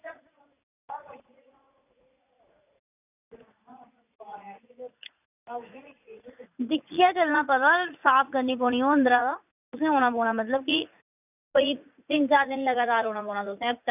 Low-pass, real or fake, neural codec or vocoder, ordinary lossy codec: 3.6 kHz; fake; vocoder, 44.1 kHz, 80 mel bands, Vocos; none